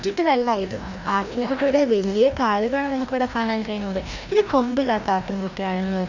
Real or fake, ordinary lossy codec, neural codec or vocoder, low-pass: fake; none; codec, 16 kHz, 1 kbps, FreqCodec, larger model; 7.2 kHz